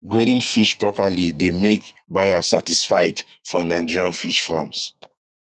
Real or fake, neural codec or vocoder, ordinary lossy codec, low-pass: fake; codec, 32 kHz, 1.9 kbps, SNAC; none; 10.8 kHz